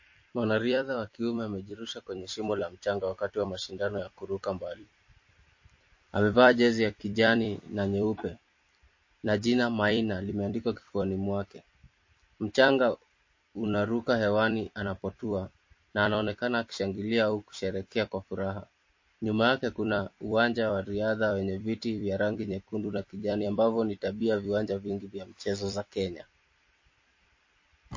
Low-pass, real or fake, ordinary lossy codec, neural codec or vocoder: 7.2 kHz; fake; MP3, 32 kbps; vocoder, 44.1 kHz, 128 mel bands every 256 samples, BigVGAN v2